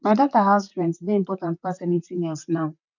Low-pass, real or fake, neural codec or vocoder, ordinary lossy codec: 7.2 kHz; fake; vocoder, 44.1 kHz, 128 mel bands, Pupu-Vocoder; none